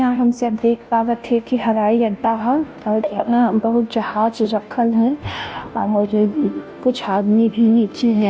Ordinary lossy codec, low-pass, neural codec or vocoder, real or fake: none; none; codec, 16 kHz, 0.5 kbps, FunCodec, trained on Chinese and English, 25 frames a second; fake